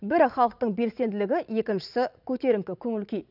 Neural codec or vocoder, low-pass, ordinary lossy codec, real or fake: vocoder, 44.1 kHz, 80 mel bands, Vocos; 5.4 kHz; none; fake